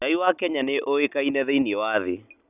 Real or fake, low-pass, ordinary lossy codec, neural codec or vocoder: real; 3.6 kHz; none; none